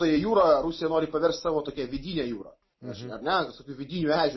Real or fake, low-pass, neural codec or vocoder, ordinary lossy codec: real; 7.2 kHz; none; MP3, 24 kbps